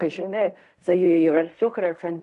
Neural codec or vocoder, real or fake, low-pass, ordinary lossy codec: codec, 16 kHz in and 24 kHz out, 0.4 kbps, LongCat-Audio-Codec, fine tuned four codebook decoder; fake; 10.8 kHz; AAC, 96 kbps